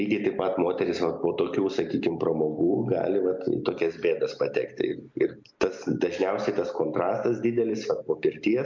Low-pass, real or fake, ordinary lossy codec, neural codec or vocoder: 7.2 kHz; real; MP3, 64 kbps; none